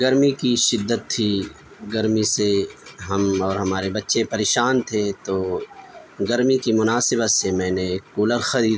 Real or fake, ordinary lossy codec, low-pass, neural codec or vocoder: real; none; none; none